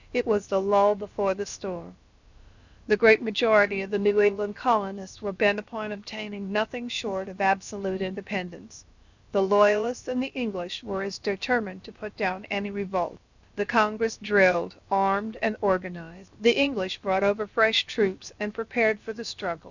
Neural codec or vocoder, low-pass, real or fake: codec, 16 kHz, about 1 kbps, DyCAST, with the encoder's durations; 7.2 kHz; fake